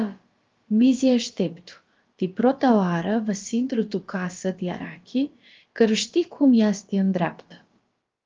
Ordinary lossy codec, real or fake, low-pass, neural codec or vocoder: Opus, 32 kbps; fake; 7.2 kHz; codec, 16 kHz, about 1 kbps, DyCAST, with the encoder's durations